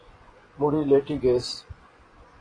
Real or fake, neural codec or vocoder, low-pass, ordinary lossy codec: fake; vocoder, 24 kHz, 100 mel bands, Vocos; 9.9 kHz; AAC, 32 kbps